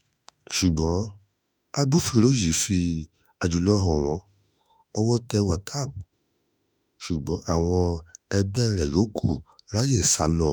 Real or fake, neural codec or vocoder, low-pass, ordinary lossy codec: fake; autoencoder, 48 kHz, 32 numbers a frame, DAC-VAE, trained on Japanese speech; none; none